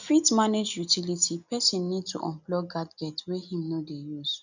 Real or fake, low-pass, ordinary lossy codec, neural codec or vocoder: real; 7.2 kHz; none; none